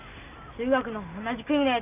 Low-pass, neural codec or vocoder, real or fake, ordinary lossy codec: 3.6 kHz; none; real; none